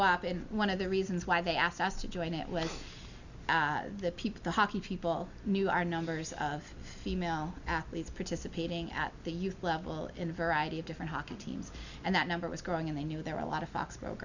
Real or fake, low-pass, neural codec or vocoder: real; 7.2 kHz; none